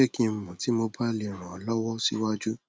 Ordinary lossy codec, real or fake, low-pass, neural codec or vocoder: none; real; none; none